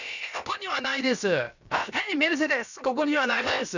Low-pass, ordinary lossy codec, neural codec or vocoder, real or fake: 7.2 kHz; none; codec, 16 kHz, about 1 kbps, DyCAST, with the encoder's durations; fake